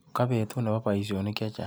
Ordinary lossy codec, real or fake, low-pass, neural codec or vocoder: none; real; none; none